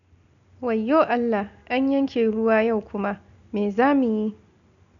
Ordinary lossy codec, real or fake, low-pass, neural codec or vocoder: none; real; 7.2 kHz; none